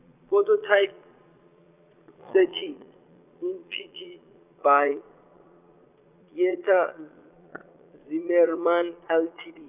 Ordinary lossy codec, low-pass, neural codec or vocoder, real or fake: none; 3.6 kHz; vocoder, 22.05 kHz, 80 mel bands, Vocos; fake